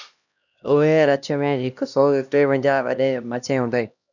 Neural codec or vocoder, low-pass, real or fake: codec, 16 kHz, 1 kbps, X-Codec, HuBERT features, trained on LibriSpeech; 7.2 kHz; fake